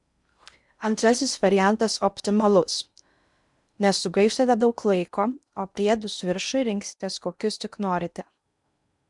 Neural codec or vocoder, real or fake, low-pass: codec, 16 kHz in and 24 kHz out, 0.6 kbps, FocalCodec, streaming, 4096 codes; fake; 10.8 kHz